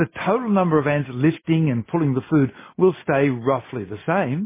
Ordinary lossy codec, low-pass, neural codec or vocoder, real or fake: MP3, 16 kbps; 3.6 kHz; none; real